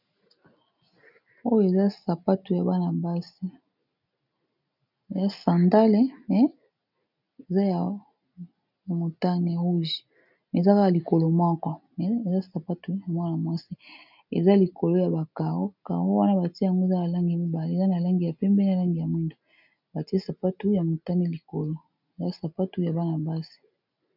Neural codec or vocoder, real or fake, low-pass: none; real; 5.4 kHz